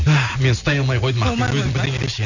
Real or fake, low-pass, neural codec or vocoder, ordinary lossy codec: real; 7.2 kHz; none; none